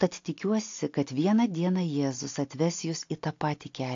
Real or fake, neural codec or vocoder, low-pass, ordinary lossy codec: real; none; 7.2 kHz; AAC, 48 kbps